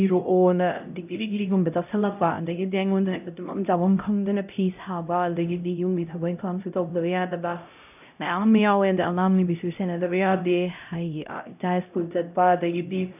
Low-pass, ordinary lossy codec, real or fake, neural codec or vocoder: 3.6 kHz; none; fake; codec, 16 kHz, 0.5 kbps, X-Codec, HuBERT features, trained on LibriSpeech